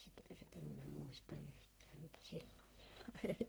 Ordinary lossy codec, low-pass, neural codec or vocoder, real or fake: none; none; codec, 44.1 kHz, 1.7 kbps, Pupu-Codec; fake